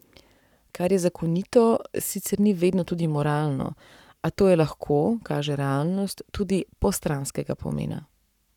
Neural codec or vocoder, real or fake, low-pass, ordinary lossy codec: codec, 44.1 kHz, 7.8 kbps, Pupu-Codec; fake; 19.8 kHz; none